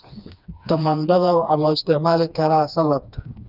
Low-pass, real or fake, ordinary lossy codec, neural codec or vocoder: 5.4 kHz; fake; none; codec, 16 kHz, 2 kbps, FreqCodec, smaller model